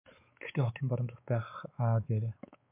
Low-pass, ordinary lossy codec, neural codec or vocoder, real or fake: 3.6 kHz; MP3, 32 kbps; codec, 16 kHz, 4 kbps, X-Codec, WavLM features, trained on Multilingual LibriSpeech; fake